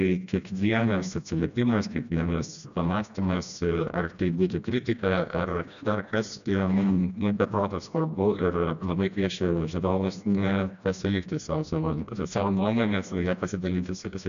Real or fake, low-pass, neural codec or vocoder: fake; 7.2 kHz; codec, 16 kHz, 1 kbps, FreqCodec, smaller model